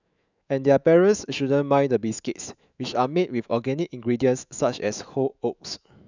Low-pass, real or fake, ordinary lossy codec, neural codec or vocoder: 7.2 kHz; fake; none; autoencoder, 48 kHz, 128 numbers a frame, DAC-VAE, trained on Japanese speech